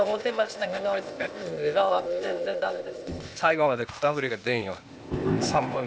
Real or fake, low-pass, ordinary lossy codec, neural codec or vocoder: fake; none; none; codec, 16 kHz, 0.8 kbps, ZipCodec